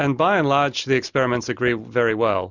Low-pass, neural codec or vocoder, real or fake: 7.2 kHz; none; real